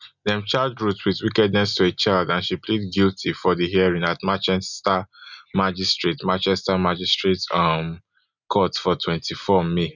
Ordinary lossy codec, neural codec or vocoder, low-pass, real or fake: none; none; 7.2 kHz; real